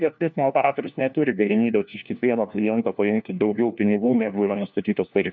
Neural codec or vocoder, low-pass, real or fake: codec, 16 kHz, 1 kbps, FunCodec, trained on LibriTTS, 50 frames a second; 7.2 kHz; fake